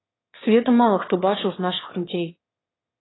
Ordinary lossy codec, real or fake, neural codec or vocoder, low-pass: AAC, 16 kbps; fake; autoencoder, 22.05 kHz, a latent of 192 numbers a frame, VITS, trained on one speaker; 7.2 kHz